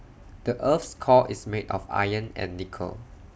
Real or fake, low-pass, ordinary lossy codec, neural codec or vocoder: real; none; none; none